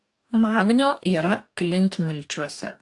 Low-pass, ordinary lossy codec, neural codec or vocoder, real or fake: 10.8 kHz; AAC, 64 kbps; codec, 44.1 kHz, 2.6 kbps, DAC; fake